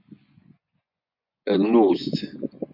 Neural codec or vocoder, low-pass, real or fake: vocoder, 24 kHz, 100 mel bands, Vocos; 5.4 kHz; fake